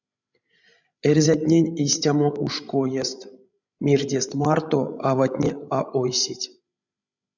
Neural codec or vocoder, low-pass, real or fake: codec, 16 kHz, 16 kbps, FreqCodec, larger model; 7.2 kHz; fake